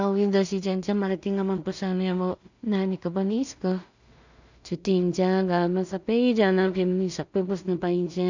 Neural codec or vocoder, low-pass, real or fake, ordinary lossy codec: codec, 16 kHz in and 24 kHz out, 0.4 kbps, LongCat-Audio-Codec, two codebook decoder; 7.2 kHz; fake; none